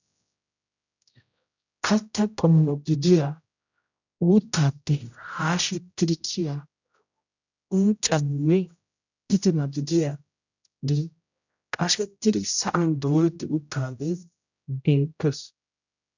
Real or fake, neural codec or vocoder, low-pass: fake; codec, 16 kHz, 0.5 kbps, X-Codec, HuBERT features, trained on general audio; 7.2 kHz